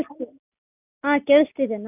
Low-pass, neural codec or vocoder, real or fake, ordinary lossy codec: 3.6 kHz; none; real; none